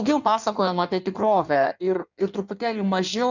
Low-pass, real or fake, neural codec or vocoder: 7.2 kHz; fake; codec, 16 kHz in and 24 kHz out, 1.1 kbps, FireRedTTS-2 codec